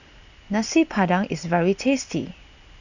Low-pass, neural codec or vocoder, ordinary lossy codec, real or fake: 7.2 kHz; none; Opus, 64 kbps; real